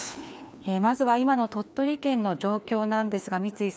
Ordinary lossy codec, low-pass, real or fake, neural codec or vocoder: none; none; fake; codec, 16 kHz, 2 kbps, FreqCodec, larger model